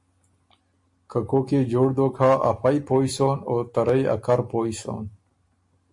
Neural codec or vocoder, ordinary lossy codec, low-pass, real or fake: none; MP3, 48 kbps; 10.8 kHz; real